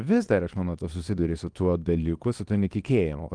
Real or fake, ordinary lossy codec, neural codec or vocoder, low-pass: fake; Opus, 24 kbps; codec, 24 kHz, 0.9 kbps, WavTokenizer, small release; 9.9 kHz